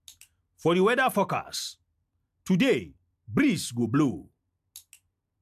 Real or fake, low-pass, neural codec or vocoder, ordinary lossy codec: real; 14.4 kHz; none; MP3, 96 kbps